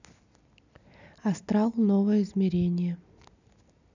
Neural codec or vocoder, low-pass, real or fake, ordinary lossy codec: none; 7.2 kHz; real; none